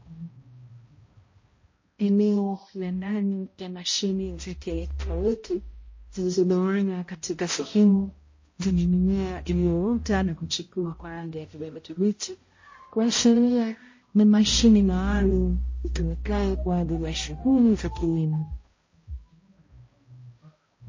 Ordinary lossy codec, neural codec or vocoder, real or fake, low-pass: MP3, 32 kbps; codec, 16 kHz, 0.5 kbps, X-Codec, HuBERT features, trained on balanced general audio; fake; 7.2 kHz